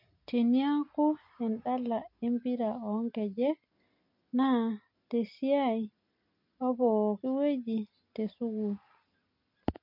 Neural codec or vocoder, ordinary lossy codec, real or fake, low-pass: none; MP3, 32 kbps; real; 5.4 kHz